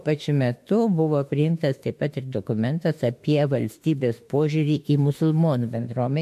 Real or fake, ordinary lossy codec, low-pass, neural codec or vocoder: fake; MP3, 64 kbps; 14.4 kHz; autoencoder, 48 kHz, 32 numbers a frame, DAC-VAE, trained on Japanese speech